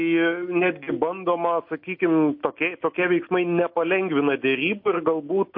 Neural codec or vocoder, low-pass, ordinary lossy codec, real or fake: none; 5.4 kHz; MP3, 32 kbps; real